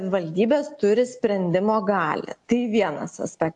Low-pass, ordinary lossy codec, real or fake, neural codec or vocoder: 10.8 kHz; AAC, 64 kbps; real; none